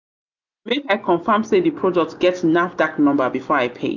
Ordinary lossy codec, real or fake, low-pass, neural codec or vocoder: none; real; 7.2 kHz; none